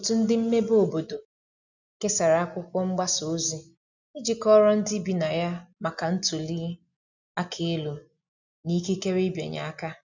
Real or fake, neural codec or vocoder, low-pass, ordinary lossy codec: real; none; 7.2 kHz; none